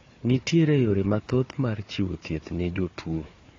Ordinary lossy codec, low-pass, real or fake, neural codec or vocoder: AAC, 32 kbps; 7.2 kHz; fake; codec, 16 kHz, 4 kbps, FunCodec, trained on Chinese and English, 50 frames a second